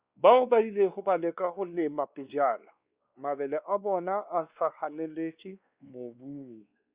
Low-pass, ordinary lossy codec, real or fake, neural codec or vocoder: 3.6 kHz; Opus, 64 kbps; fake; codec, 16 kHz, 2 kbps, X-Codec, WavLM features, trained on Multilingual LibriSpeech